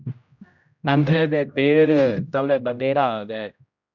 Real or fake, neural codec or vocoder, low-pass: fake; codec, 16 kHz, 0.5 kbps, X-Codec, HuBERT features, trained on general audio; 7.2 kHz